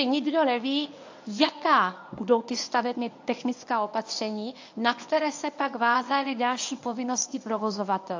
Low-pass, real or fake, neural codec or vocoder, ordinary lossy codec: 7.2 kHz; fake; codec, 24 kHz, 0.9 kbps, WavTokenizer, medium speech release version 1; AAC, 48 kbps